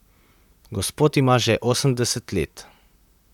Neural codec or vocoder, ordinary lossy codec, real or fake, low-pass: vocoder, 44.1 kHz, 128 mel bands, Pupu-Vocoder; none; fake; 19.8 kHz